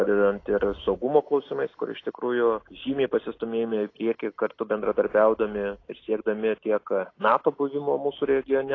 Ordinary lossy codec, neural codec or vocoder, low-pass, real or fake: AAC, 32 kbps; none; 7.2 kHz; real